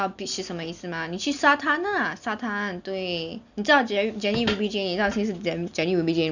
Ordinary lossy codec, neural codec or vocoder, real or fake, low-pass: none; none; real; 7.2 kHz